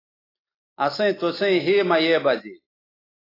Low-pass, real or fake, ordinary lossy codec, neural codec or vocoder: 5.4 kHz; real; AAC, 24 kbps; none